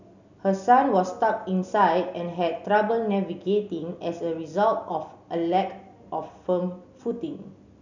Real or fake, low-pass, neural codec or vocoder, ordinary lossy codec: real; 7.2 kHz; none; none